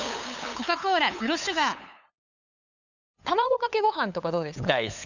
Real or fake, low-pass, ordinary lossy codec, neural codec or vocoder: fake; 7.2 kHz; none; codec, 16 kHz, 8 kbps, FunCodec, trained on LibriTTS, 25 frames a second